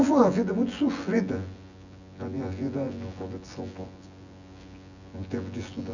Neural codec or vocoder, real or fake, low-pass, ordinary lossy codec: vocoder, 24 kHz, 100 mel bands, Vocos; fake; 7.2 kHz; none